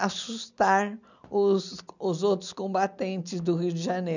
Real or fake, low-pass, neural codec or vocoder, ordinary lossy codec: real; 7.2 kHz; none; none